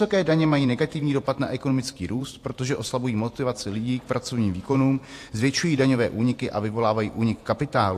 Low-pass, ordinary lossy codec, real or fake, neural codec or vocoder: 14.4 kHz; AAC, 64 kbps; fake; vocoder, 48 kHz, 128 mel bands, Vocos